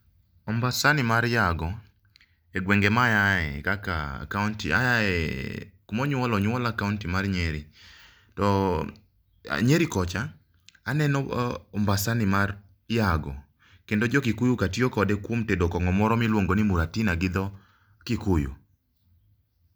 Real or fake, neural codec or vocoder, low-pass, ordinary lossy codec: real; none; none; none